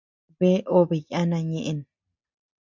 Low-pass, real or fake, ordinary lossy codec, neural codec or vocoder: 7.2 kHz; real; MP3, 64 kbps; none